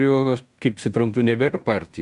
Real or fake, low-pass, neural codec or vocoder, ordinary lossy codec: fake; 10.8 kHz; codec, 16 kHz in and 24 kHz out, 0.9 kbps, LongCat-Audio-Codec, fine tuned four codebook decoder; Opus, 64 kbps